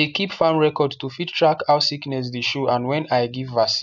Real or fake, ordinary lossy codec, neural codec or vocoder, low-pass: real; none; none; 7.2 kHz